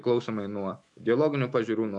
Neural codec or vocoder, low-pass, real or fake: autoencoder, 48 kHz, 128 numbers a frame, DAC-VAE, trained on Japanese speech; 10.8 kHz; fake